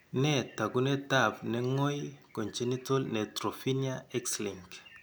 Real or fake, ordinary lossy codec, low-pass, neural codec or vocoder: real; none; none; none